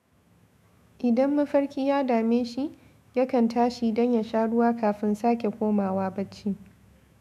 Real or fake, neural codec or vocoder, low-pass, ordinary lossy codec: fake; autoencoder, 48 kHz, 128 numbers a frame, DAC-VAE, trained on Japanese speech; 14.4 kHz; none